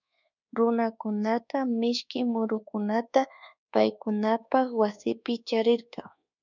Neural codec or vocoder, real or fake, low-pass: codec, 24 kHz, 1.2 kbps, DualCodec; fake; 7.2 kHz